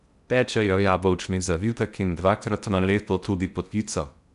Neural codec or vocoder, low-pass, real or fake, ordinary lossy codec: codec, 16 kHz in and 24 kHz out, 0.6 kbps, FocalCodec, streaming, 2048 codes; 10.8 kHz; fake; none